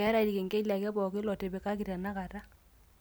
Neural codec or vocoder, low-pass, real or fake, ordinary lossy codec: none; none; real; none